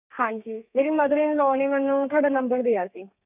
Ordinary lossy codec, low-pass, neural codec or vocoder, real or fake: none; 3.6 kHz; codec, 32 kHz, 1.9 kbps, SNAC; fake